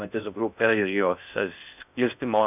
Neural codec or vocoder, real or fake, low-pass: codec, 16 kHz in and 24 kHz out, 0.6 kbps, FocalCodec, streaming, 4096 codes; fake; 3.6 kHz